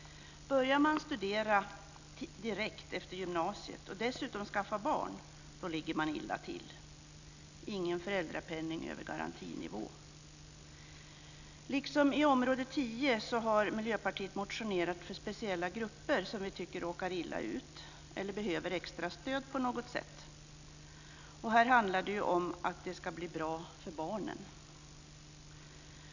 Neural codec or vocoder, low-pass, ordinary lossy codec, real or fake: none; 7.2 kHz; none; real